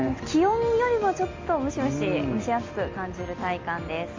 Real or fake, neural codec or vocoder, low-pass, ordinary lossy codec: real; none; 7.2 kHz; Opus, 32 kbps